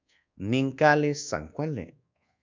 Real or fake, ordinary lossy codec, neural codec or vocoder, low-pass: fake; MP3, 64 kbps; codec, 24 kHz, 1.2 kbps, DualCodec; 7.2 kHz